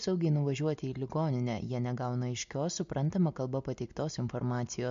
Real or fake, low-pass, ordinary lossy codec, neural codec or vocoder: real; 7.2 kHz; MP3, 48 kbps; none